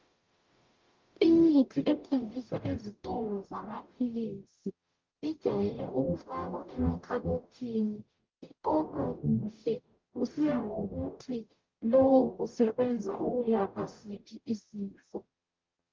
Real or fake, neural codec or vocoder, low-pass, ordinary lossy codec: fake; codec, 44.1 kHz, 0.9 kbps, DAC; 7.2 kHz; Opus, 32 kbps